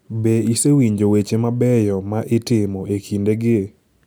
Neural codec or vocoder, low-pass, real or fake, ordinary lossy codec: none; none; real; none